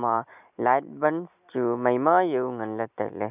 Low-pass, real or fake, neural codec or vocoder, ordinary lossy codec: 3.6 kHz; real; none; none